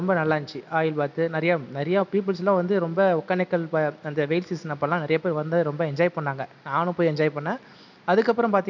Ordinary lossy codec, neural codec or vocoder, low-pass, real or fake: none; none; 7.2 kHz; real